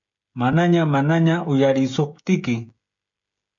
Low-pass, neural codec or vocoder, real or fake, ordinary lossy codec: 7.2 kHz; codec, 16 kHz, 16 kbps, FreqCodec, smaller model; fake; MP3, 64 kbps